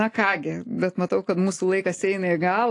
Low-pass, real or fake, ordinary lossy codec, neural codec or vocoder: 10.8 kHz; real; AAC, 48 kbps; none